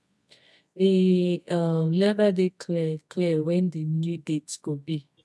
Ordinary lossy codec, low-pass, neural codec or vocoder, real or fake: none; none; codec, 24 kHz, 0.9 kbps, WavTokenizer, medium music audio release; fake